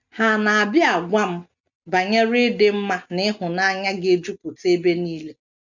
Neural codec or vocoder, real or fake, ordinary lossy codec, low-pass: none; real; none; 7.2 kHz